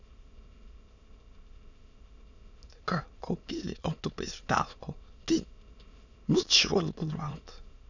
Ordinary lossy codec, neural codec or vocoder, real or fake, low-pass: none; autoencoder, 22.05 kHz, a latent of 192 numbers a frame, VITS, trained on many speakers; fake; 7.2 kHz